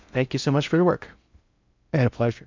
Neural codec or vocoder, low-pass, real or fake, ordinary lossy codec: codec, 16 kHz in and 24 kHz out, 0.6 kbps, FocalCodec, streaming, 2048 codes; 7.2 kHz; fake; MP3, 64 kbps